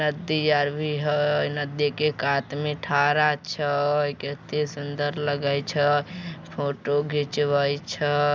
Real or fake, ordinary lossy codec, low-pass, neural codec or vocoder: real; none; none; none